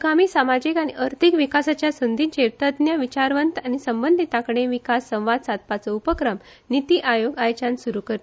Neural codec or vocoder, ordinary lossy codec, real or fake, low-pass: none; none; real; none